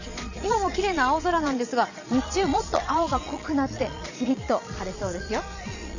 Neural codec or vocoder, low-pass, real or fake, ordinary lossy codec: vocoder, 22.05 kHz, 80 mel bands, Vocos; 7.2 kHz; fake; none